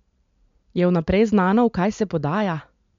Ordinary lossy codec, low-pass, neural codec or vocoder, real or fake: MP3, 64 kbps; 7.2 kHz; none; real